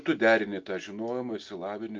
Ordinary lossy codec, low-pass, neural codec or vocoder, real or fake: Opus, 24 kbps; 7.2 kHz; none; real